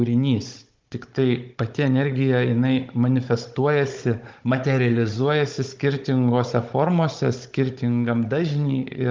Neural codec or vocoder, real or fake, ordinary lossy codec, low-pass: codec, 16 kHz, 16 kbps, FunCodec, trained on Chinese and English, 50 frames a second; fake; Opus, 32 kbps; 7.2 kHz